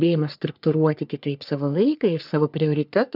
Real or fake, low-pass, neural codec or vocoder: fake; 5.4 kHz; codec, 44.1 kHz, 3.4 kbps, Pupu-Codec